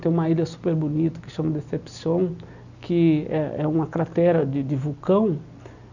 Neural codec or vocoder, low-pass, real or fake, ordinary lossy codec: none; 7.2 kHz; real; none